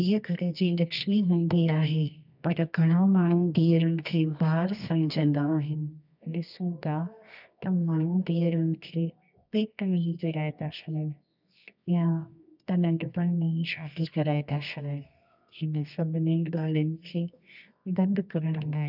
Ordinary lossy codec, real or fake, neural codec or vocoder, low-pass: none; fake; codec, 24 kHz, 0.9 kbps, WavTokenizer, medium music audio release; 5.4 kHz